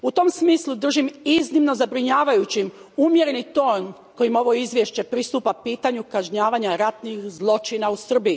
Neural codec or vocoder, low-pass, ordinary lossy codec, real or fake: none; none; none; real